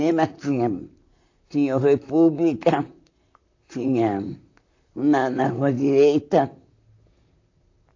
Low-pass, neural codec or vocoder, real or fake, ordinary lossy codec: 7.2 kHz; vocoder, 44.1 kHz, 128 mel bands, Pupu-Vocoder; fake; AAC, 48 kbps